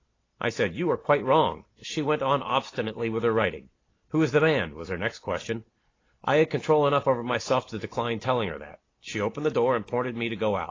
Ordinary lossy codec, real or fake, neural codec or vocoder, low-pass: AAC, 32 kbps; real; none; 7.2 kHz